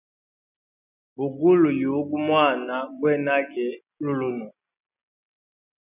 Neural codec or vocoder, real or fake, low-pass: none; real; 3.6 kHz